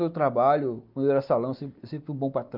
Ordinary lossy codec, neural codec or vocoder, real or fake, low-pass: Opus, 24 kbps; none; real; 5.4 kHz